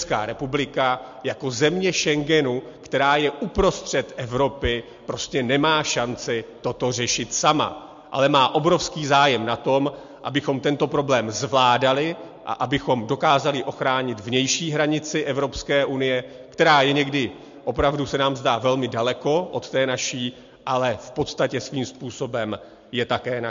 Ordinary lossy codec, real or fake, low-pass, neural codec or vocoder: MP3, 48 kbps; real; 7.2 kHz; none